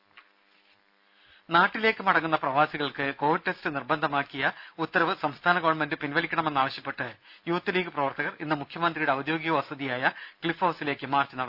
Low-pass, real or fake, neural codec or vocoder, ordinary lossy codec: 5.4 kHz; real; none; Opus, 64 kbps